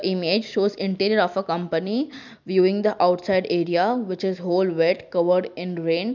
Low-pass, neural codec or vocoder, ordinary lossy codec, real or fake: 7.2 kHz; none; none; real